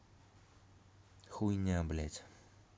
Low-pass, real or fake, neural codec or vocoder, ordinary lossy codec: none; real; none; none